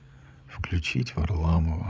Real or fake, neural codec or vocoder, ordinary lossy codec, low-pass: fake; codec, 16 kHz, 16 kbps, FreqCodec, larger model; none; none